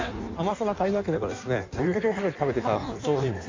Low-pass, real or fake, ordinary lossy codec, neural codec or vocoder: 7.2 kHz; fake; MP3, 64 kbps; codec, 16 kHz in and 24 kHz out, 1.1 kbps, FireRedTTS-2 codec